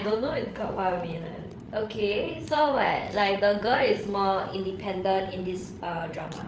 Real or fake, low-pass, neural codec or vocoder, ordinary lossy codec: fake; none; codec, 16 kHz, 8 kbps, FreqCodec, larger model; none